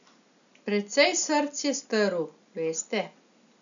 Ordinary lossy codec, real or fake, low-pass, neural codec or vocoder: none; real; 7.2 kHz; none